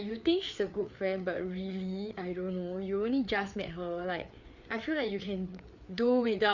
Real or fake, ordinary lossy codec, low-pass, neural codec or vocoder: fake; none; 7.2 kHz; codec, 16 kHz, 4 kbps, FreqCodec, larger model